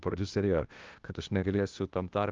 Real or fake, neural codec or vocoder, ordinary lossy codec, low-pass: fake; codec, 16 kHz, 0.8 kbps, ZipCodec; Opus, 24 kbps; 7.2 kHz